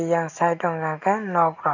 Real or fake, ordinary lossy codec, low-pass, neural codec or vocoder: real; none; 7.2 kHz; none